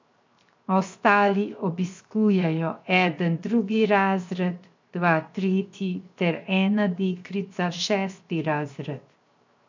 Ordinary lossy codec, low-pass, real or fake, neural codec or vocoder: MP3, 64 kbps; 7.2 kHz; fake; codec, 16 kHz, 0.7 kbps, FocalCodec